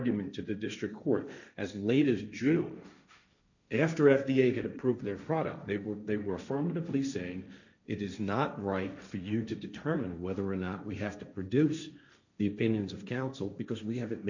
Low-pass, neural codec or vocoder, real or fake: 7.2 kHz; codec, 16 kHz, 1.1 kbps, Voila-Tokenizer; fake